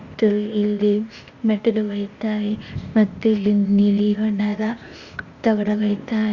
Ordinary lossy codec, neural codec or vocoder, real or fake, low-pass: Opus, 64 kbps; codec, 16 kHz, 0.8 kbps, ZipCodec; fake; 7.2 kHz